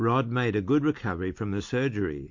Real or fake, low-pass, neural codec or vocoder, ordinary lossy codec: real; 7.2 kHz; none; MP3, 48 kbps